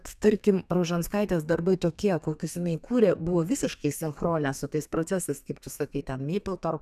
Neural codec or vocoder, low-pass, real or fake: codec, 32 kHz, 1.9 kbps, SNAC; 14.4 kHz; fake